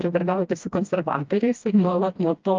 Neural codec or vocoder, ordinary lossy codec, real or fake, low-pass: codec, 16 kHz, 1 kbps, FreqCodec, smaller model; Opus, 24 kbps; fake; 7.2 kHz